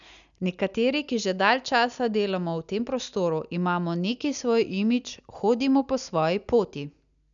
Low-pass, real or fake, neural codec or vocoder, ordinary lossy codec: 7.2 kHz; real; none; none